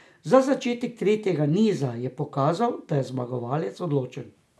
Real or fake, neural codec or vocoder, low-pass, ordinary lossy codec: real; none; none; none